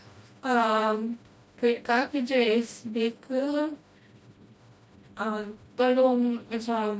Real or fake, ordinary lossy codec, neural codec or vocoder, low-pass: fake; none; codec, 16 kHz, 1 kbps, FreqCodec, smaller model; none